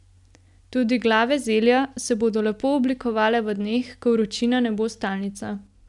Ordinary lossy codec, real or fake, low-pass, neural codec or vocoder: none; real; 10.8 kHz; none